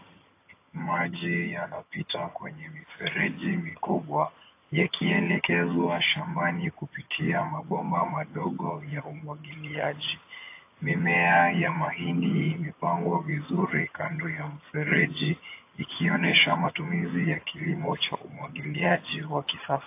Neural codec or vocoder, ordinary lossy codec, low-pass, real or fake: vocoder, 22.05 kHz, 80 mel bands, HiFi-GAN; AAC, 24 kbps; 3.6 kHz; fake